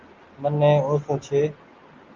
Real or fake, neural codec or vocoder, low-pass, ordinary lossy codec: real; none; 7.2 kHz; Opus, 24 kbps